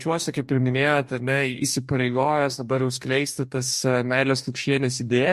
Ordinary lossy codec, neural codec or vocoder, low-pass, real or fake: MP3, 64 kbps; codec, 44.1 kHz, 2.6 kbps, DAC; 14.4 kHz; fake